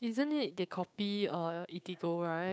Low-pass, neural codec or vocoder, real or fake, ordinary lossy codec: none; codec, 16 kHz, 6 kbps, DAC; fake; none